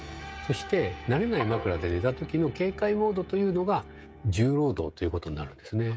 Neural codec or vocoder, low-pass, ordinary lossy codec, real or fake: codec, 16 kHz, 16 kbps, FreqCodec, smaller model; none; none; fake